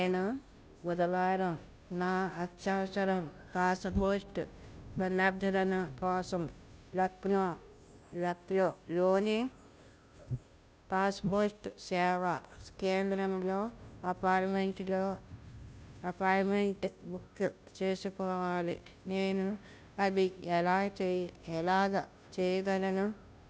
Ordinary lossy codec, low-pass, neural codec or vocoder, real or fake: none; none; codec, 16 kHz, 0.5 kbps, FunCodec, trained on Chinese and English, 25 frames a second; fake